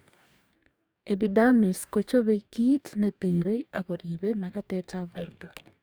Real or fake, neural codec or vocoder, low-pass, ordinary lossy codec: fake; codec, 44.1 kHz, 2.6 kbps, DAC; none; none